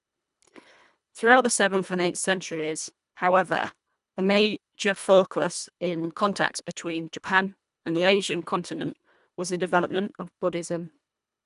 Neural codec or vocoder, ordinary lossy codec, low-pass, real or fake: codec, 24 kHz, 1.5 kbps, HILCodec; none; 10.8 kHz; fake